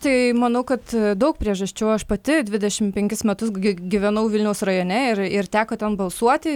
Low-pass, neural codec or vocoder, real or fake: 19.8 kHz; none; real